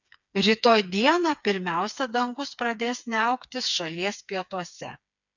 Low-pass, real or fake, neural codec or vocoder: 7.2 kHz; fake; codec, 16 kHz, 4 kbps, FreqCodec, smaller model